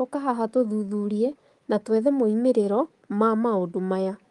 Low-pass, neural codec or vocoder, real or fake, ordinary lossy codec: 10.8 kHz; codec, 24 kHz, 3.1 kbps, DualCodec; fake; Opus, 24 kbps